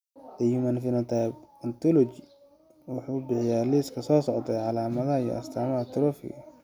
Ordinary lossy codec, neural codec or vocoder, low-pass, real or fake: none; none; 19.8 kHz; real